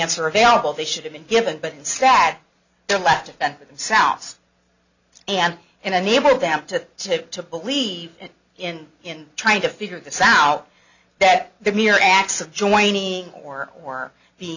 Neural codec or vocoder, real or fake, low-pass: none; real; 7.2 kHz